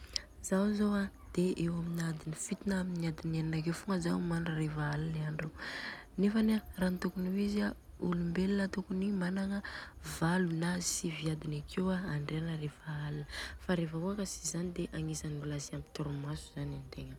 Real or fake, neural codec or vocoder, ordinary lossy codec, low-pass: real; none; none; 19.8 kHz